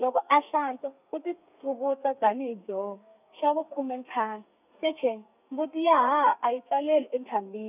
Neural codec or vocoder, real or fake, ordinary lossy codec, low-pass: codec, 32 kHz, 1.9 kbps, SNAC; fake; none; 3.6 kHz